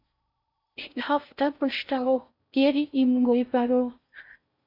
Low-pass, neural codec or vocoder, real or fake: 5.4 kHz; codec, 16 kHz in and 24 kHz out, 0.6 kbps, FocalCodec, streaming, 4096 codes; fake